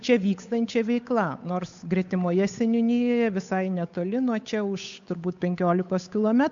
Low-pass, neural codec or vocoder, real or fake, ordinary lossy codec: 7.2 kHz; codec, 16 kHz, 8 kbps, FunCodec, trained on Chinese and English, 25 frames a second; fake; MP3, 64 kbps